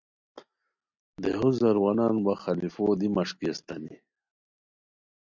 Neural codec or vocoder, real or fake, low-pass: none; real; 7.2 kHz